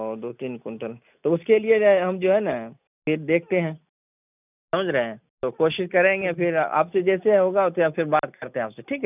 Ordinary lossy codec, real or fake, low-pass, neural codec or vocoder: none; real; 3.6 kHz; none